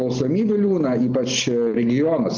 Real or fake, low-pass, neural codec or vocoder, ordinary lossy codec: real; 7.2 kHz; none; Opus, 16 kbps